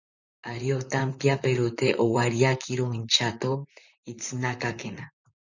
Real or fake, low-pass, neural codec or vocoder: fake; 7.2 kHz; vocoder, 44.1 kHz, 128 mel bands, Pupu-Vocoder